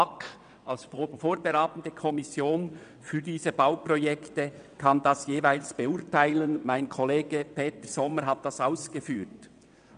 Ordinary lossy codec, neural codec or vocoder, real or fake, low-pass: none; vocoder, 22.05 kHz, 80 mel bands, WaveNeXt; fake; 9.9 kHz